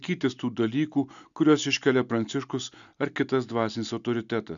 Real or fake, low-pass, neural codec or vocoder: real; 7.2 kHz; none